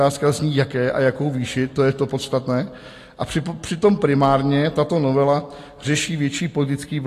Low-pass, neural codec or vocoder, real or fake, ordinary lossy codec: 14.4 kHz; none; real; AAC, 48 kbps